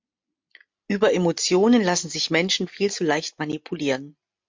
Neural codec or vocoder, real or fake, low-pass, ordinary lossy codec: none; real; 7.2 kHz; MP3, 48 kbps